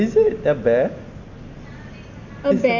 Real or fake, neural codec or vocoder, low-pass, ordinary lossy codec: real; none; 7.2 kHz; none